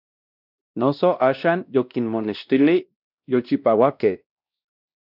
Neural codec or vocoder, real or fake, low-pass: codec, 16 kHz, 1 kbps, X-Codec, WavLM features, trained on Multilingual LibriSpeech; fake; 5.4 kHz